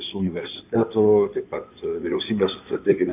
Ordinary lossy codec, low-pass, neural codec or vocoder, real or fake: AAC, 32 kbps; 3.6 kHz; codec, 16 kHz in and 24 kHz out, 2.2 kbps, FireRedTTS-2 codec; fake